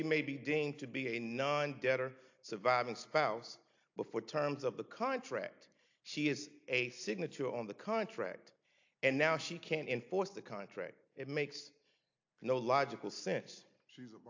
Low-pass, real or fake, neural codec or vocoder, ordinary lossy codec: 7.2 kHz; real; none; AAC, 48 kbps